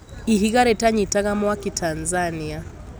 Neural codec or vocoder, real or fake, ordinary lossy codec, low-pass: none; real; none; none